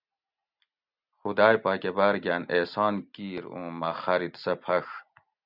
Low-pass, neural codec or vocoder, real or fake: 5.4 kHz; none; real